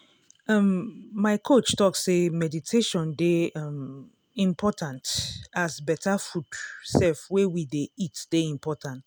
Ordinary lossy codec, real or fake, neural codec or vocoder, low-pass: none; real; none; none